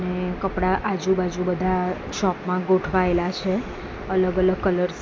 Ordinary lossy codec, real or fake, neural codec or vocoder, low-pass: none; real; none; none